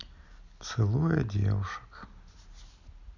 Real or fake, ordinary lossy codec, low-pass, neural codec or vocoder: real; none; 7.2 kHz; none